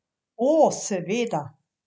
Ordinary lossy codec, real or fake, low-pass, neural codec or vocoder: none; real; none; none